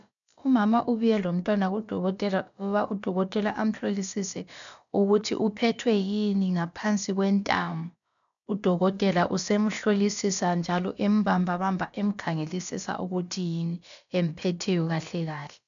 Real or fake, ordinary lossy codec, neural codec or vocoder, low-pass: fake; MP3, 96 kbps; codec, 16 kHz, about 1 kbps, DyCAST, with the encoder's durations; 7.2 kHz